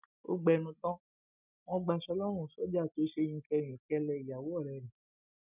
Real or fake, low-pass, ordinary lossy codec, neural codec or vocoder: real; 3.6 kHz; none; none